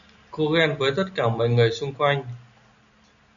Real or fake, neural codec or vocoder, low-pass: real; none; 7.2 kHz